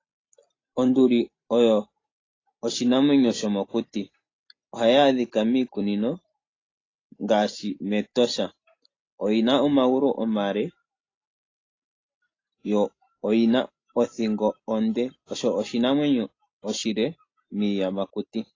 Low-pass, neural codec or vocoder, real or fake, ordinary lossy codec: 7.2 kHz; none; real; AAC, 32 kbps